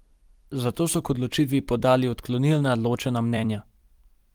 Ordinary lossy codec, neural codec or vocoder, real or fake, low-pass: Opus, 24 kbps; vocoder, 44.1 kHz, 128 mel bands every 256 samples, BigVGAN v2; fake; 19.8 kHz